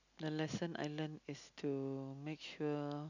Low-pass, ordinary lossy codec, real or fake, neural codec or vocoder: 7.2 kHz; none; real; none